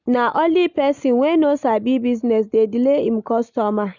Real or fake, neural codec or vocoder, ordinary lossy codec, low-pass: real; none; none; 7.2 kHz